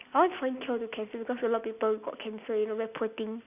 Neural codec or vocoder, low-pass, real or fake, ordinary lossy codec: none; 3.6 kHz; real; none